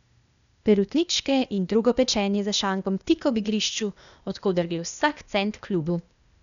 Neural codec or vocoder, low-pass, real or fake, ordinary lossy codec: codec, 16 kHz, 0.8 kbps, ZipCodec; 7.2 kHz; fake; none